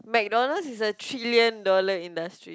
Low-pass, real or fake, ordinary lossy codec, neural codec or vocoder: none; real; none; none